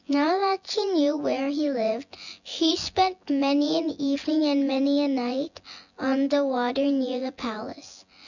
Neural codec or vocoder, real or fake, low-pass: vocoder, 24 kHz, 100 mel bands, Vocos; fake; 7.2 kHz